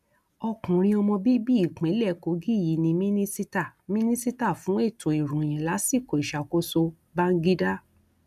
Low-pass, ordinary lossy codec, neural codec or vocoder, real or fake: 14.4 kHz; none; none; real